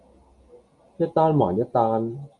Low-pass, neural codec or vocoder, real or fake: 10.8 kHz; none; real